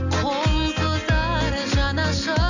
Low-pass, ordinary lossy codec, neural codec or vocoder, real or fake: 7.2 kHz; none; none; real